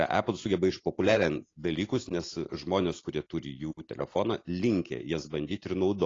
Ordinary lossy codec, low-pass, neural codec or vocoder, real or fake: AAC, 32 kbps; 7.2 kHz; none; real